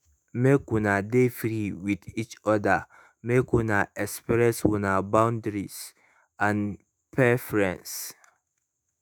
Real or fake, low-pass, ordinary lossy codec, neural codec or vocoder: fake; none; none; autoencoder, 48 kHz, 128 numbers a frame, DAC-VAE, trained on Japanese speech